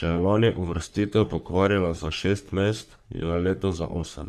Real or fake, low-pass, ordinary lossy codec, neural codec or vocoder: fake; 14.4 kHz; none; codec, 44.1 kHz, 3.4 kbps, Pupu-Codec